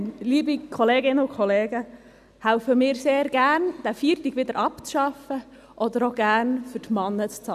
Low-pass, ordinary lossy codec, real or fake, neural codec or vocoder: 14.4 kHz; none; real; none